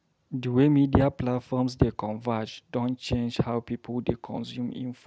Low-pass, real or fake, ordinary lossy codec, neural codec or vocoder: none; real; none; none